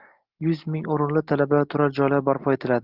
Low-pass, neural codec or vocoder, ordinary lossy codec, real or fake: 5.4 kHz; none; Opus, 16 kbps; real